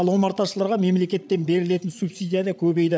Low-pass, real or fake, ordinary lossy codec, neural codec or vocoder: none; fake; none; codec, 16 kHz, 8 kbps, FreqCodec, larger model